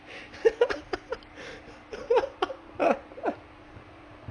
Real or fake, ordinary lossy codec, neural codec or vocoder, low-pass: real; none; none; 9.9 kHz